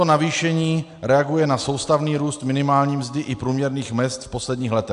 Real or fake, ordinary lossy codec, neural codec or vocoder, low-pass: real; AAC, 64 kbps; none; 10.8 kHz